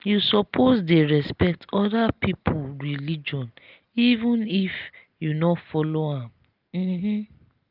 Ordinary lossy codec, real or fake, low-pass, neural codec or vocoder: Opus, 32 kbps; real; 5.4 kHz; none